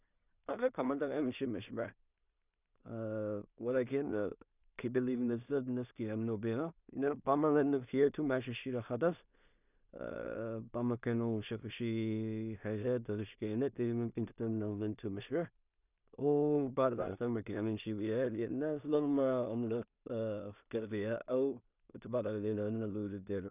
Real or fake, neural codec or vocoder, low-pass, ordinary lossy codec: fake; codec, 16 kHz in and 24 kHz out, 0.4 kbps, LongCat-Audio-Codec, two codebook decoder; 3.6 kHz; none